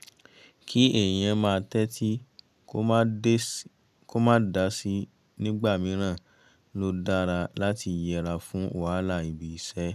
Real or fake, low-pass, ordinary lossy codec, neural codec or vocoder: real; 14.4 kHz; none; none